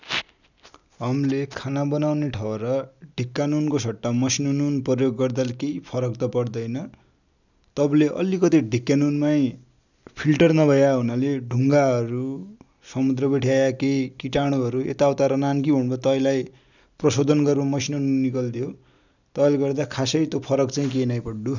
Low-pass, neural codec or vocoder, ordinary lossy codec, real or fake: 7.2 kHz; none; none; real